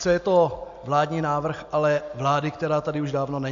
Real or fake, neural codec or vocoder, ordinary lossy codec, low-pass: real; none; AAC, 64 kbps; 7.2 kHz